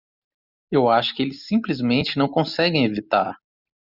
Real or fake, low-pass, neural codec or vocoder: real; 5.4 kHz; none